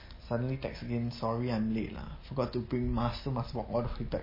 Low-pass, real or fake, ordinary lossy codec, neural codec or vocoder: 5.4 kHz; real; MP3, 24 kbps; none